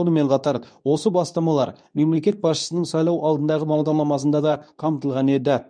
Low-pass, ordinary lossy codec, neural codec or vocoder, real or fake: 9.9 kHz; none; codec, 24 kHz, 0.9 kbps, WavTokenizer, medium speech release version 2; fake